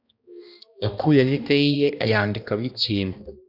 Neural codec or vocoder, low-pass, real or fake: codec, 16 kHz, 1 kbps, X-Codec, HuBERT features, trained on balanced general audio; 5.4 kHz; fake